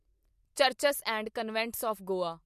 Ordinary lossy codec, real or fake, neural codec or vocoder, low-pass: MP3, 64 kbps; real; none; 14.4 kHz